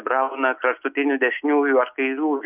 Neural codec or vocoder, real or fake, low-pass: none; real; 3.6 kHz